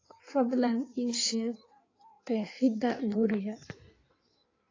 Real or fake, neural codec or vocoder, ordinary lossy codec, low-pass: fake; codec, 16 kHz in and 24 kHz out, 1.1 kbps, FireRedTTS-2 codec; AAC, 32 kbps; 7.2 kHz